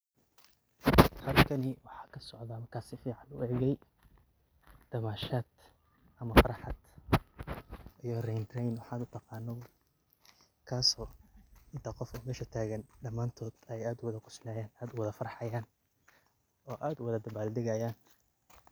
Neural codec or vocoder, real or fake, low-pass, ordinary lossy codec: none; real; none; none